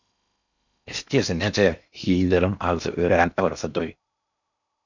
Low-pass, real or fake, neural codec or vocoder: 7.2 kHz; fake; codec, 16 kHz in and 24 kHz out, 0.8 kbps, FocalCodec, streaming, 65536 codes